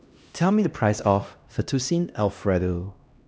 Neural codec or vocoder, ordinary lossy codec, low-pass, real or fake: codec, 16 kHz, 1 kbps, X-Codec, HuBERT features, trained on LibriSpeech; none; none; fake